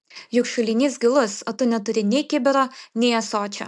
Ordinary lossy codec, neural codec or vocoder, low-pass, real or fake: MP3, 96 kbps; none; 10.8 kHz; real